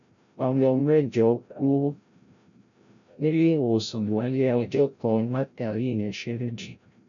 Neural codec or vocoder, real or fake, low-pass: codec, 16 kHz, 0.5 kbps, FreqCodec, larger model; fake; 7.2 kHz